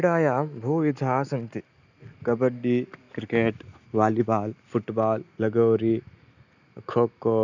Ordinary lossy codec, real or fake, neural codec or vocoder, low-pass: none; real; none; 7.2 kHz